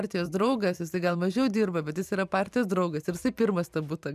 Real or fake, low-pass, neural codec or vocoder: fake; 14.4 kHz; vocoder, 44.1 kHz, 128 mel bands every 512 samples, BigVGAN v2